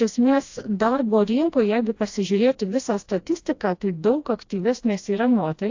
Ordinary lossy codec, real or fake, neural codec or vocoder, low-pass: AAC, 48 kbps; fake; codec, 16 kHz, 1 kbps, FreqCodec, smaller model; 7.2 kHz